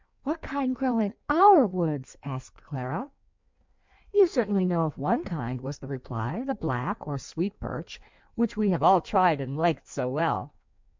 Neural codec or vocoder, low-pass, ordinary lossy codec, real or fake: codec, 16 kHz in and 24 kHz out, 1.1 kbps, FireRedTTS-2 codec; 7.2 kHz; MP3, 64 kbps; fake